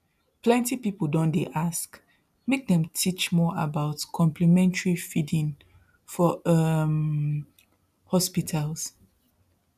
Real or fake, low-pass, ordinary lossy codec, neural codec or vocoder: real; 14.4 kHz; none; none